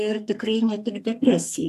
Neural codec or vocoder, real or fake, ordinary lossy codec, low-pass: codec, 44.1 kHz, 2.6 kbps, SNAC; fake; AAC, 96 kbps; 14.4 kHz